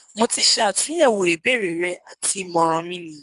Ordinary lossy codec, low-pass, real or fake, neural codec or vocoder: none; 10.8 kHz; fake; codec, 24 kHz, 3 kbps, HILCodec